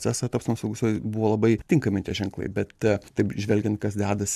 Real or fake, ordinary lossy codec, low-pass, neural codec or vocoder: real; MP3, 96 kbps; 14.4 kHz; none